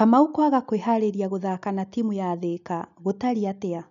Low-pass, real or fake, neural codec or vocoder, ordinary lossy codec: 7.2 kHz; real; none; none